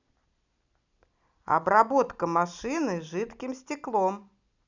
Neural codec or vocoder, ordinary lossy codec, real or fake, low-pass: none; none; real; 7.2 kHz